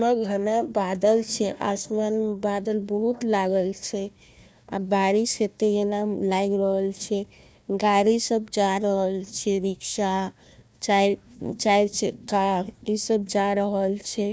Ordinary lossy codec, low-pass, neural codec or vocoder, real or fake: none; none; codec, 16 kHz, 1 kbps, FunCodec, trained on Chinese and English, 50 frames a second; fake